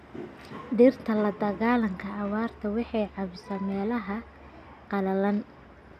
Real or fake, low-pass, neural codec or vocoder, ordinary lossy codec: real; 14.4 kHz; none; none